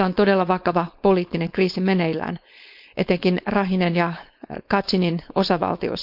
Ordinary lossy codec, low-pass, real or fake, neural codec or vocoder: none; 5.4 kHz; fake; codec, 16 kHz, 4.8 kbps, FACodec